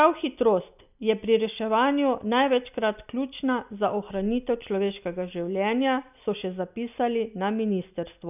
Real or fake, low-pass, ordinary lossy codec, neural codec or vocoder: real; 3.6 kHz; none; none